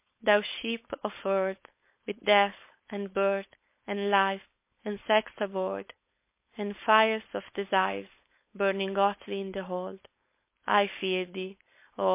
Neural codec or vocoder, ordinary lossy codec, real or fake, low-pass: codec, 16 kHz, 4.8 kbps, FACodec; MP3, 24 kbps; fake; 3.6 kHz